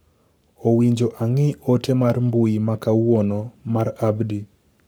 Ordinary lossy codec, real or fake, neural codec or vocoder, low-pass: none; fake; codec, 44.1 kHz, 7.8 kbps, Pupu-Codec; none